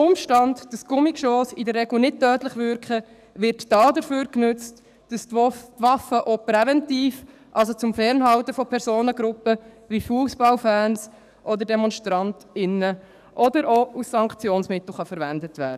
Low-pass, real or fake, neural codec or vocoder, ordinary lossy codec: 14.4 kHz; fake; codec, 44.1 kHz, 7.8 kbps, DAC; none